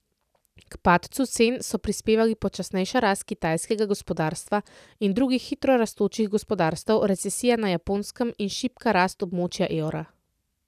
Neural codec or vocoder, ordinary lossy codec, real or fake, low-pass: none; none; real; 14.4 kHz